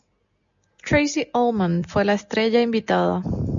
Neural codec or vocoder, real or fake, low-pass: none; real; 7.2 kHz